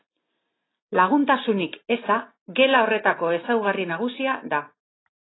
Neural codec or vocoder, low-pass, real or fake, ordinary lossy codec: none; 7.2 kHz; real; AAC, 16 kbps